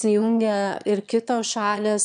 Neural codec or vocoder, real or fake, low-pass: autoencoder, 22.05 kHz, a latent of 192 numbers a frame, VITS, trained on one speaker; fake; 9.9 kHz